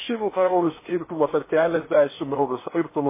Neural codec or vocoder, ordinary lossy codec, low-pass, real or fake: codec, 16 kHz in and 24 kHz out, 0.8 kbps, FocalCodec, streaming, 65536 codes; MP3, 16 kbps; 3.6 kHz; fake